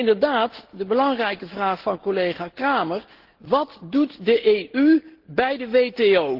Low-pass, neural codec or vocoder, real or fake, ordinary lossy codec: 5.4 kHz; none; real; Opus, 16 kbps